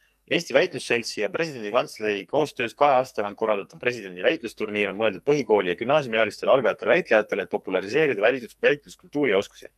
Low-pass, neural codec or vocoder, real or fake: 14.4 kHz; codec, 44.1 kHz, 2.6 kbps, SNAC; fake